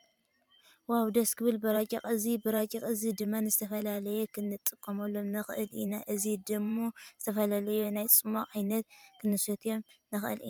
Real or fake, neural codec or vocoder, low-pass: fake; vocoder, 44.1 kHz, 128 mel bands every 512 samples, BigVGAN v2; 19.8 kHz